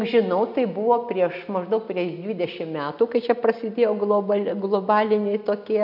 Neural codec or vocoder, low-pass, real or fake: none; 5.4 kHz; real